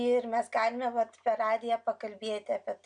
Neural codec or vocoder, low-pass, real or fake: none; 9.9 kHz; real